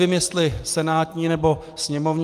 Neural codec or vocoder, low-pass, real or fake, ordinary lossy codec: none; 14.4 kHz; real; Opus, 32 kbps